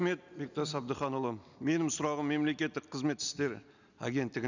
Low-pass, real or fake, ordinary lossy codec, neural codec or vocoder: 7.2 kHz; real; none; none